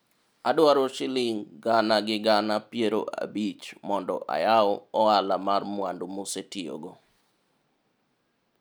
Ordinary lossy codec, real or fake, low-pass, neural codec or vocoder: none; real; none; none